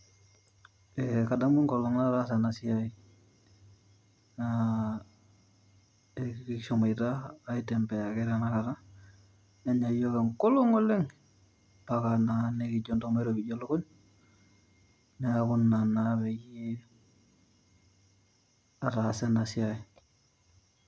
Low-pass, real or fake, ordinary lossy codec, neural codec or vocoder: none; real; none; none